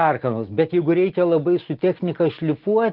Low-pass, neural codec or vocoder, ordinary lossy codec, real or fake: 5.4 kHz; none; Opus, 16 kbps; real